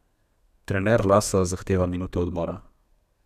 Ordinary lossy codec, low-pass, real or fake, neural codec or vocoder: none; 14.4 kHz; fake; codec, 32 kHz, 1.9 kbps, SNAC